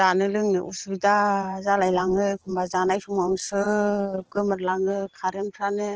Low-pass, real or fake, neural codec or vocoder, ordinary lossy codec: 7.2 kHz; fake; vocoder, 44.1 kHz, 128 mel bands every 512 samples, BigVGAN v2; Opus, 16 kbps